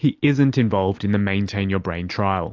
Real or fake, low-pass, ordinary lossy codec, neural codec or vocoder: real; 7.2 kHz; AAC, 48 kbps; none